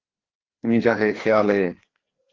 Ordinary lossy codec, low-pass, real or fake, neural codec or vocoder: Opus, 16 kbps; 7.2 kHz; fake; codec, 16 kHz, 2 kbps, FreqCodec, larger model